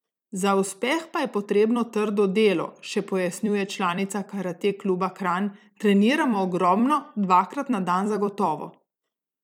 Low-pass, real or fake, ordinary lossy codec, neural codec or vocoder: 19.8 kHz; fake; none; vocoder, 44.1 kHz, 128 mel bands every 256 samples, BigVGAN v2